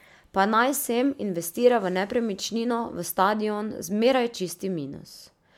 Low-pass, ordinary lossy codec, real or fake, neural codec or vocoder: 19.8 kHz; MP3, 96 kbps; real; none